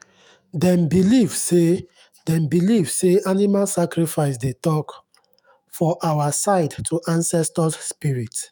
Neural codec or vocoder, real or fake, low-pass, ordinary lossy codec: autoencoder, 48 kHz, 128 numbers a frame, DAC-VAE, trained on Japanese speech; fake; none; none